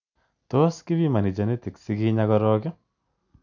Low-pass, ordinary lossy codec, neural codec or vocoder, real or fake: 7.2 kHz; none; none; real